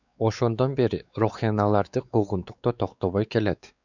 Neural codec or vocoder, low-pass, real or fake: codec, 16 kHz, 4 kbps, X-Codec, WavLM features, trained on Multilingual LibriSpeech; 7.2 kHz; fake